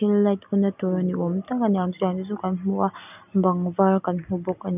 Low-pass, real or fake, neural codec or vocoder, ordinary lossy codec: 3.6 kHz; real; none; none